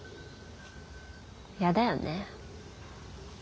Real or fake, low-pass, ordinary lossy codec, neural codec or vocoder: real; none; none; none